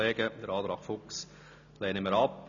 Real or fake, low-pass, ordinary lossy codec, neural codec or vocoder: real; 7.2 kHz; none; none